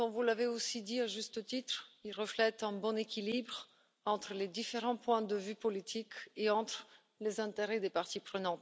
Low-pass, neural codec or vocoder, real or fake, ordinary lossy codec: none; none; real; none